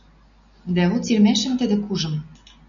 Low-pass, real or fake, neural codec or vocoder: 7.2 kHz; real; none